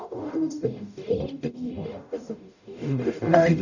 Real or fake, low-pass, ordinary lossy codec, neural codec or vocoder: fake; 7.2 kHz; none; codec, 44.1 kHz, 0.9 kbps, DAC